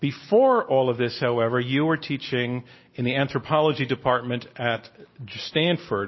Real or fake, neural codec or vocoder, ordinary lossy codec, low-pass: real; none; MP3, 24 kbps; 7.2 kHz